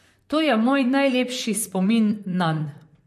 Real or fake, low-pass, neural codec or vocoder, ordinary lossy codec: fake; 14.4 kHz; vocoder, 44.1 kHz, 128 mel bands, Pupu-Vocoder; MP3, 64 kbps